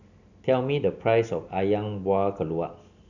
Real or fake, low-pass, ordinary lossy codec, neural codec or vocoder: real; 7.2 kHz; none; none